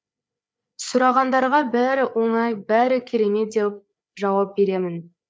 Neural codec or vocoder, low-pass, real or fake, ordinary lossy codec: codec, 16 kHz, 4 kbps, FreqCodec, larger model; none; fake; none